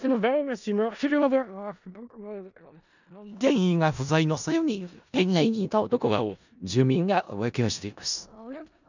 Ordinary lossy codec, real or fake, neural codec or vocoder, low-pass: none; fake; codec, 16 kHz in and 24 kHz out, 0.4 kbps, LongCat-Audio-Codec, four codebook decoder; 7.2 kHz